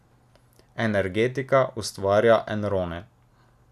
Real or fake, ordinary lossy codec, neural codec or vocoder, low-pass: real; none; none; 14.4 kHz